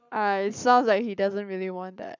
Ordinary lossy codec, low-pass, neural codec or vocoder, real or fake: none; 7.2 kHz; codec, 44.1 kHz, 7.8 kbps, Pupu-Codec; fake